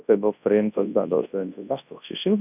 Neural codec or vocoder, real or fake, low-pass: codec, 24 kHz, 0.9 kbps, WavTokenizer, large speech release; fake; 3.6 kHz